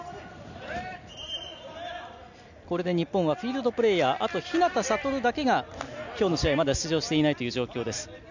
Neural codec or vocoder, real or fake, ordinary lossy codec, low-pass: none; real; none; 7.2 kHz